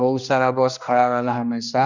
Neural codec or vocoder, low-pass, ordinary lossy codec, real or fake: codec, 16 kHz, 1 kbps, X-Codec, HuBERT features, trained on general audio; 7.2 kHz; none; fake